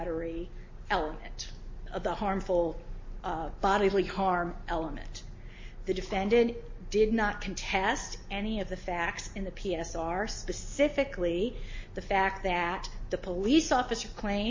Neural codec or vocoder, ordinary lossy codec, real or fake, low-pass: none; MP3, 32 kbps; real; 7.2 kHz